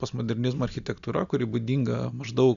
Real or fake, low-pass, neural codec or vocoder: real; 7.2 kHz; none